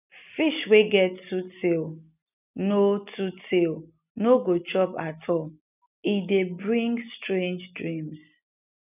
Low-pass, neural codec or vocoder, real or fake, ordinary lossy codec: 3.6 kHz; none; real; none